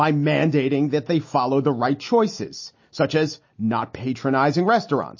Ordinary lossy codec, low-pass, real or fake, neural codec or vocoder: MP3, 32 kbps; 7.2 kHz; real; none